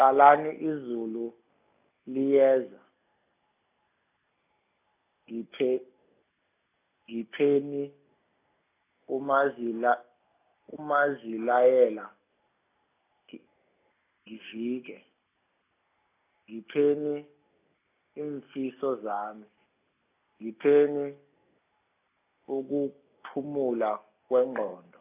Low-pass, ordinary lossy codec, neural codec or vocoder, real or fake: 3.6 kHz; AAC, 24 kbps; none; real